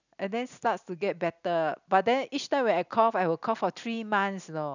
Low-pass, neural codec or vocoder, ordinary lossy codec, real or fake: 7.2 kHz; none; none; real